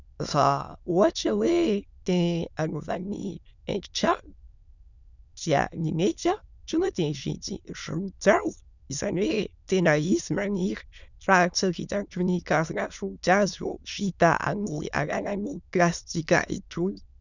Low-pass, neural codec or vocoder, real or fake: 7.2 kHz; autoencoder, 22.05 kHz, a latent of 192 numbers a frame, VITS, trained on many speakers; fake